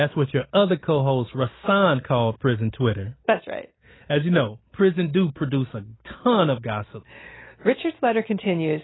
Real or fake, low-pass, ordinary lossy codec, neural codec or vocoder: real; 7.2 kHz; AAC, 16 kbps; none